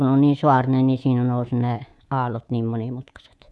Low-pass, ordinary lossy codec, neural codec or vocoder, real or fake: none; none; codec, 24 kHz, 3.1 kbps, DualCodec; fake